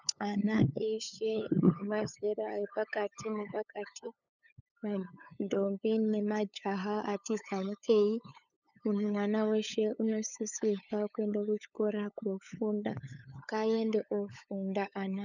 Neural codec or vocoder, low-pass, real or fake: codec, 16 kHz, 8 kbps, FunCodec, trained on LibriTTS, 25 frames a second; 7.2 kHz; fake